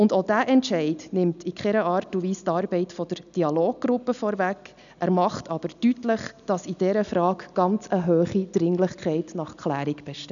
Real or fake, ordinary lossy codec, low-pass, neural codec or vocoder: real; none; 7.2 kHz; none